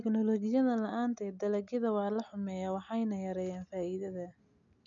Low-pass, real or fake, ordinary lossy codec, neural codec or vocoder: 7.2 kHz; real; none; none